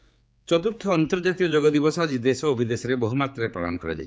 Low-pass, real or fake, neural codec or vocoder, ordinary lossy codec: none; fake; codec, 16 kHz, 4 kbps, X-Codec, HuBERT features, trained on general audio; none